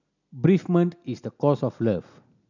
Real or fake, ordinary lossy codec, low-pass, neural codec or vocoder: real; none; 7.2 kHz; none